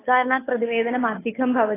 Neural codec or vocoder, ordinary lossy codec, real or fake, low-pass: codec, 16 kHz, 2 kbps, FunCodec, trained on Chinese and English, 25 frames a second; AAC, 16 kbps; fake; 3.6 kHz